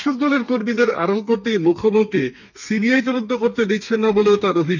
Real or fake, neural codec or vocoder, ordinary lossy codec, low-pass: fake; codec, 32 kHz, 1.9 kbps, SNAC; none; 7.2 kHz